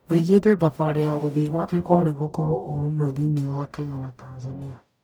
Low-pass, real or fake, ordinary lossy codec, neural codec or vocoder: none; fake; none; codec, 44.1 kHz, 0.9 kbps, DAC